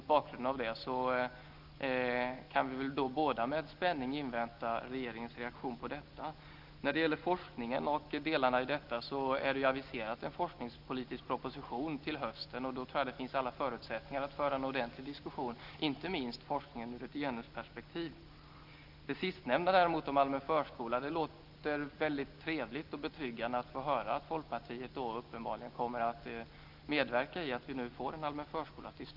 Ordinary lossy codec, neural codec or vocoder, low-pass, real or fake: Opus, 32 kbps; none; 5.4 kHz; real